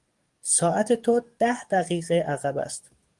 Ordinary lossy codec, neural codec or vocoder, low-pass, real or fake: Opus, 24 kbps; codec, 44.1 kHz, 7.8 kbps, DAC; 10.8 kHz; fake